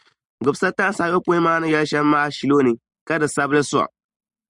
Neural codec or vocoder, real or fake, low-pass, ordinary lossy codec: none; real; 10.8 kHz; Opus, 64 kbps